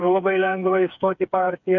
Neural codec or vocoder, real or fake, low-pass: codec, 16 kHz, 4 kbps, FreqCodec, smaller model; fake; 7.2 kHz